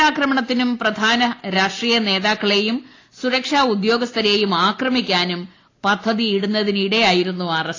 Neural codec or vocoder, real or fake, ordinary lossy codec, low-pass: none; real; AAC, 32 kbps; 7.2 kHz